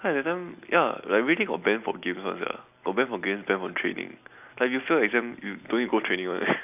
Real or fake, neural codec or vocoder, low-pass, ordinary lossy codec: real; none; 3.6 kHz; none